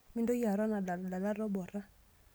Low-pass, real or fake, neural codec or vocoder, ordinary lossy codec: none; real; none; none